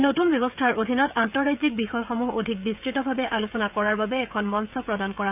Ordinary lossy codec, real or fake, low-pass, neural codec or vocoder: none; fake; 3.6 kHz; codec, 16 kHz, 16 kbps, FreqCodec, smaller model